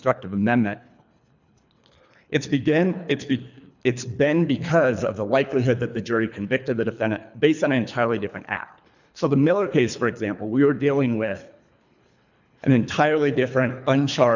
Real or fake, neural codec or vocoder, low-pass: fake; codec, 24 kHz, 3 kbps, HILCodec; 7.2 kHz